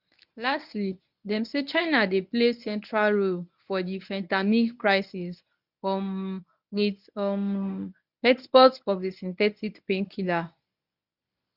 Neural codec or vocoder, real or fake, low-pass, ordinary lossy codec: codec, 24 kHz, 0.9 kbps, WavTokenizer, medium speech release version 1; fake; 5.4 kHz; none